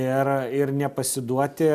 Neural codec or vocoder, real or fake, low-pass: none; real; 14.4 kHz